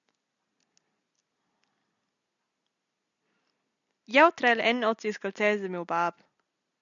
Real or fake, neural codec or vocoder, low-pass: real; none; 7.2 kHz